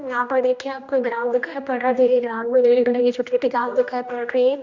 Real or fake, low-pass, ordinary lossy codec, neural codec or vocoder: fake; 7.2 kHz; Opus, 64 kbps; codec, 16 kHz, 1 kbps, X-Codec, HuBERT features, trained on general audio